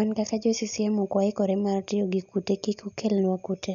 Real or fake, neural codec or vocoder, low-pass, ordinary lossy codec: real; none; 7.2 kHz; none